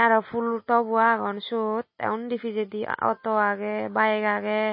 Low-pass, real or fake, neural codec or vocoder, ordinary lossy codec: 7.2 kHz; real; none; MP3, 24 kbps